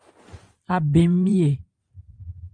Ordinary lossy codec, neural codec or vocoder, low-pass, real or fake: Opus, 32 kbps; vocoder, 44.1 kHz, 128 mel bands every 512 samples, BigVGAN v2; 9.9 kHz; fake